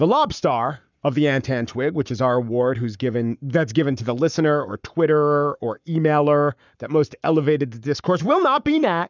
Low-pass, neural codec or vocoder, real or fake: 7.2 kHz; codec, 44.1 kHz, 7.8 kbps, Pupu-Codec; fake